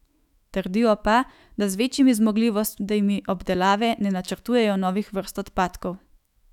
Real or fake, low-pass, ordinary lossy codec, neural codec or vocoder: fake; 19.8 kHz; none; autoencoder, 48 kHz, 128 numbers a frame, DAC-VAE, trained on Japanese speech